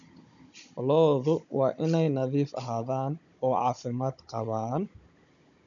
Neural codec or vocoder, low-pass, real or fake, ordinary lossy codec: codec, 16 kHz, 16 kbps, FunCodec, trained on Chinese and English, 50 frames a second; 7.2 kHz; fake; none